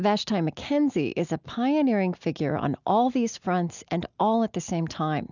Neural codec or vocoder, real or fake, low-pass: none; real; 7.2 kHz